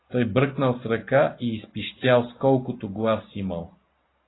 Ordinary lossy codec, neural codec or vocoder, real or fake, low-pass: AAC, 16 kbps; none; real; 7.2 kHz